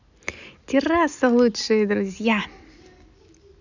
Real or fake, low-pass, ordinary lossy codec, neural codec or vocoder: real; 7.2 kHz; none; none